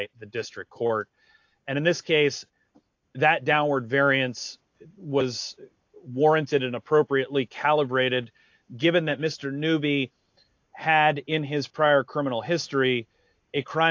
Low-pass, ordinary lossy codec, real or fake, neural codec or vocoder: 7.2 kHz; AAC, 48 kbps; real; none